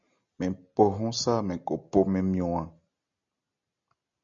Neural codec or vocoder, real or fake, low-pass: none; real; 7.2 kHz